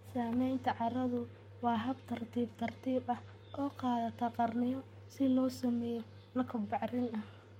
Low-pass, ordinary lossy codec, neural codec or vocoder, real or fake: 19.8 kHz; MP3, 64 kbps; codec, 44.1 kHz, 7.8 kbps, Pupu-Codec; fake